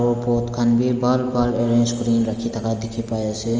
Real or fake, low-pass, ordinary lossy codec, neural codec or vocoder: real; 7.2 kHz; Opus, 24 kbps; none